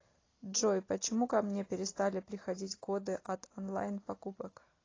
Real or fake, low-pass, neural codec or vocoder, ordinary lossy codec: real; 7.2 kHz; none; AAC, 32 kbps